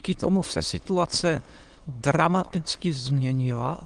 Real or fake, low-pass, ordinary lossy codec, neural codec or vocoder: fake; 9.9 kHz; Opus, 24 kbps; autoencoder, 22.05 kHz, a latent of 192 numbers a frame, VITS, trained on many speakers